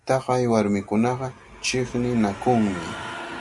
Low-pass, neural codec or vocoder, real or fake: 10.8 kHz; none; real